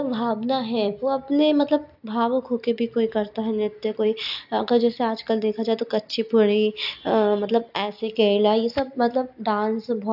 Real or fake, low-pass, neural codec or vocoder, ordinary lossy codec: real; 5.4 kHz; none; AAC, 48 kbps